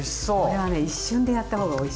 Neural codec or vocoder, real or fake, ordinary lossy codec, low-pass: none; real; none; none